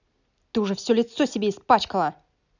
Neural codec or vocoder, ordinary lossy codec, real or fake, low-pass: none; none; real; 7.2 kHz